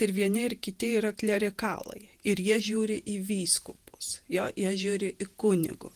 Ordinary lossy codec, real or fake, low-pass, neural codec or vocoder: Opus, 24 kbps; fake; 14.4 kHz; vocoder, 48 kHz, 128 mel bands, Vocos